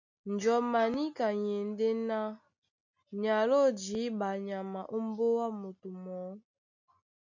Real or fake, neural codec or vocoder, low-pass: real; none; 7.2 kHz